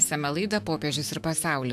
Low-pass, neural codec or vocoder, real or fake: 14.4 kHz; autoencoder, 48 kHz, 128 numbers a frame, DAC-VAE, trained on Japanese speech; fake